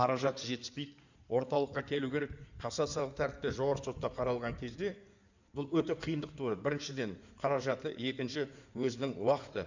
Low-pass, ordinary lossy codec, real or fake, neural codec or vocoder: 7.2 kHz; none; fake; codec, 16 kHz in and 24 kHz out, 2.2 kbps, FireRedTTS-2 codec